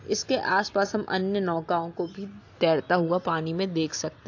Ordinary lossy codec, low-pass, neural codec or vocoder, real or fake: AAC, 48 kbps; 7.2 kHz; none; real